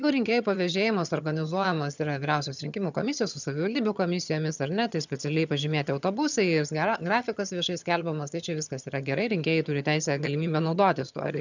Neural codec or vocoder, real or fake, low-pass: vocoder, 22.05 kHz, 80 mel bands, HiFi-GAN; fake; 7.2 kHz